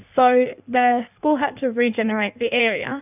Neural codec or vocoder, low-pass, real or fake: codec, 16 kHz in and 24 kHz out, 1.1 kbps, FireRedTTS-2 codec; 3.6 kHz; fake